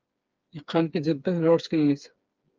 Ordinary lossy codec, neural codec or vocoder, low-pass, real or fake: Opus, 32 kbps; codec, 16 kHz, 4 kbps, FreqCodec, smaller model; 7.2 kHz; fake